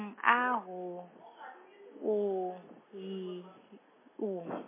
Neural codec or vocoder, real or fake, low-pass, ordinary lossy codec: none; real; 3.6 kHz; MP3, 16 kbps